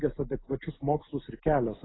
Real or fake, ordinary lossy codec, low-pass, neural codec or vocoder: real; AAC, 16 kbps; 7.2 kHz; none